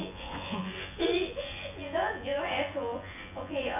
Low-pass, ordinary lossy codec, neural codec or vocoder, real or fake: 3.6 kHz; none; vocoder, 24 kHz, 100 mel bands, Vocos; fake